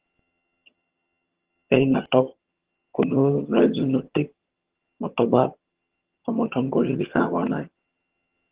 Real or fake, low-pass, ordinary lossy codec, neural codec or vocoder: fake; 3.6 kHz; Opus, 16 kbps; vocoder, 22.05 kHz, 80 mel bands, HiFi-GAN